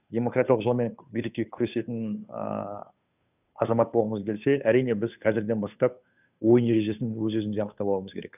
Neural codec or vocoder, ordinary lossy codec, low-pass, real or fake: codec, 16 kHz, 2 kbps, FunCodec, trained on Chinese and English, 25 frames a second; none; 3.6 kHz; fake